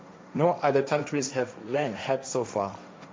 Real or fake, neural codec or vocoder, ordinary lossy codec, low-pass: fake; codec, 16 kHz, 1.1 kbps, Voila-Tokenizer; none; none